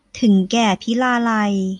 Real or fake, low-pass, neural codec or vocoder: real; 10.8 kHz; none